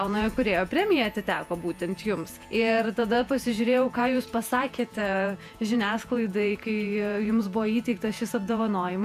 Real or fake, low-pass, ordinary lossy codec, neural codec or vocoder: fake; 14.4 kHz; AAC, 96 kbps; vocoder, 48 kHz, 128 mel bands, Vocos